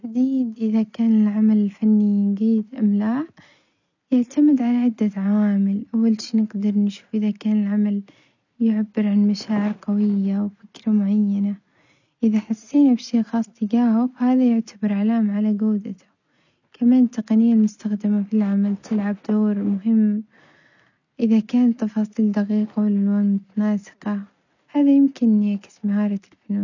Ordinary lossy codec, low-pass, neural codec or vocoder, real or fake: none; 7.2 kHz; none; real